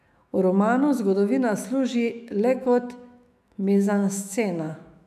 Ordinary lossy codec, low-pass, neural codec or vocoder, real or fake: none; 14.4 kHz; autoencoder, 48 kHz, 128 numbers a frame, DAC-VAE, trained on Japanese speech; fake